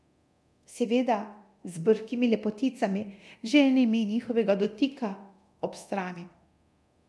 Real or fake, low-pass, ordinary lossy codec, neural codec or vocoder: fake; none; none; codec, 24 kHz, 0.9 kbps, DualCodec